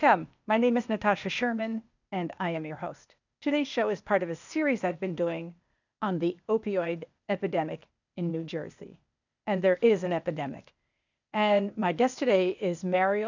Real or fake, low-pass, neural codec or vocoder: fake; 7.2 kHz; codec, 16 kHz, 0.8 kbps, ZipCodec